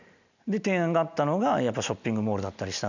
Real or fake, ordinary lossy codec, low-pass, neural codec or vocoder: real; none; 7.2 kHz; none